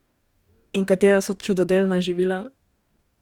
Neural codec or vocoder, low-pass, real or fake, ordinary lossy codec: codec, 44.1 kHz, 2.6 kbps, DAC; 19.8 kHz; fake; Opus, 64 kbps